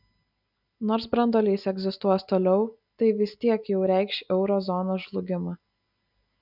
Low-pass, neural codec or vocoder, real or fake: 5.4 kHz; none; real